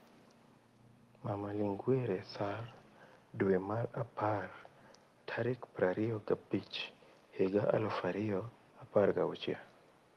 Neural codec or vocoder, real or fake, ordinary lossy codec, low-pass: none; real; Opus, 24 kbps; 14.4 kHz